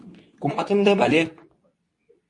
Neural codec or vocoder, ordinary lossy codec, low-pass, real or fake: codec, 24 kHz, 0.9 kbps, WavTokenizer, medium speech release version 2; MP3, 48 kbps; 10.8 kHz; fake